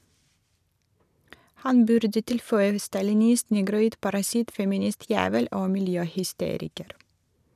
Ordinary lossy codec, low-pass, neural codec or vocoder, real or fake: none; 14.4 kHz; none; real